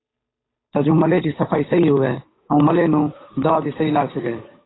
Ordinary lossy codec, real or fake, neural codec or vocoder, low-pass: AAC, 16 kbps; fake; codec, 16 kHz, 8 kbps, FunCodec, trained on Chinese and English, 25 frames a second; 7.2 kHz